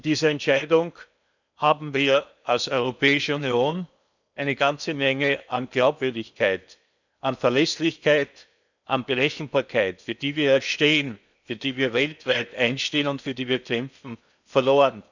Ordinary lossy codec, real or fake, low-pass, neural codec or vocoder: none; fake; 7.2 kHz; codec, 16 kHz in and 24 kHz out, 0.8 kbps, FocalCodec, streaming, 65536 codes